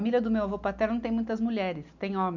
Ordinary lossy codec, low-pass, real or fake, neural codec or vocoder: none; 7.2 kHz; real; none